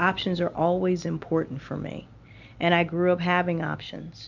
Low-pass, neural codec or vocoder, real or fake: 7.2 kHz; none; real